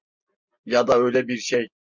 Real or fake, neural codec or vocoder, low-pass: real; none; 7.2 kHz